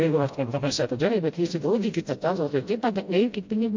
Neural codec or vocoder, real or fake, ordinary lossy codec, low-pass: codec, 16 kHz, 0.5 kbps, FreqCodec, smaller model; fake; MP3, 48 kbps; 7.2 kHz